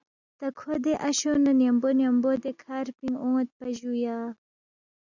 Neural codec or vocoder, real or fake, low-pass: none; real; 7.2 kHz